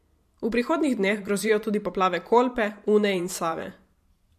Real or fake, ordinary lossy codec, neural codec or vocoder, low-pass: fake; MP3, 64 kbps; vocoder, 44.1 kHz, 128 mel bands every 512 samples, BigVGAN v2; 14.4 kHz